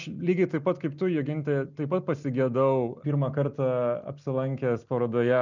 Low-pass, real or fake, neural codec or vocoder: 7.2 kHz; real; none